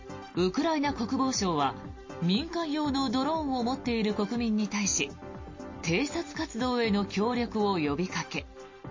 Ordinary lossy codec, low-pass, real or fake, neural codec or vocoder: MP3, 32 kbps; 7.2 kHz; real; none